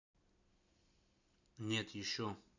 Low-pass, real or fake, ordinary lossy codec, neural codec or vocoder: 7.2 kHz; real; none; none